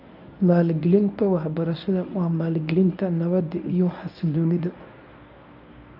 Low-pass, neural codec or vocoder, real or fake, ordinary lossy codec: 5.4 kHz; codec, 24 kHz, 0.9 kbps, WavTokenizer, medium speech release version 1; fake; MP3, 48 kbps